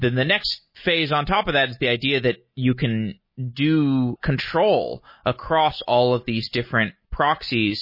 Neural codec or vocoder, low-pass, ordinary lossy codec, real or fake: none; 5.4 kHz; MP3, 24 kbps; real